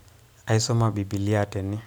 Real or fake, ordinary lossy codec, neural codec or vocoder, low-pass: real; none; none; none